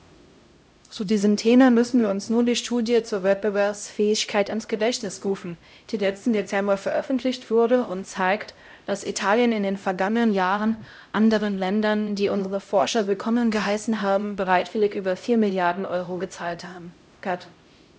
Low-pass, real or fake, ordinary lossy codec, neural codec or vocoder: none; fake; none; codec, 16 kHz, 0.5 kbps, X-Codec, HuBERT features, trained on LibriSpeech